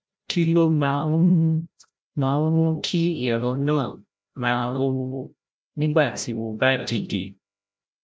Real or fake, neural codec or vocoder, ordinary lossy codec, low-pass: fake; codec, 16 kHz, 0.5 kbps, FreqCodec, larger model; none; none